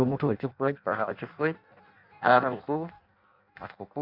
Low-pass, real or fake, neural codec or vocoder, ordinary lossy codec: 5.4 kHz; fake; codec, 16 kHz in and 24 kHz out, 0.6 kbps, FireRedTTS-2 codec; none